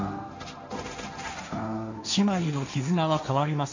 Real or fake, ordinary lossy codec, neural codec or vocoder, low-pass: fake; none; codec, 16 kHz, 1.1 kbps, Voila-Tokenizer; 7.2 kHz